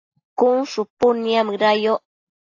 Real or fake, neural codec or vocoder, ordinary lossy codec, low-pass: real; none; AAC, 48 kbps; 7.2 kHz